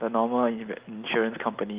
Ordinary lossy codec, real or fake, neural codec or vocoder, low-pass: Opus, 32 kbps; real; none; 3.6 kHz